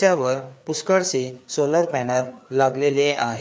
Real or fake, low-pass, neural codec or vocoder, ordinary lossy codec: fake; none; codec, 16 kHz, 4 kbps, FreqCodec, larger model; none